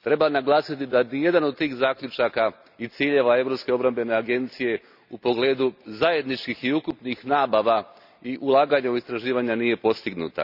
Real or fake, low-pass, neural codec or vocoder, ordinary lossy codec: real; 5.4 kHz; none; none